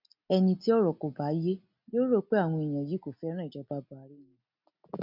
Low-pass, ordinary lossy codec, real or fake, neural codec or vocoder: 5.4 kHz; none; real; none